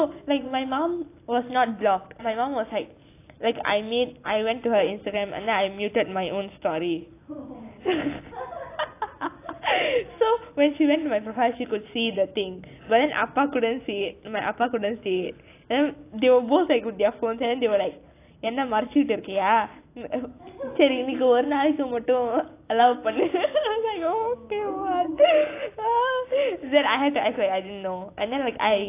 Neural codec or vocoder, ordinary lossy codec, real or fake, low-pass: none; AAC, 24 kbps; real; 3.6 kHz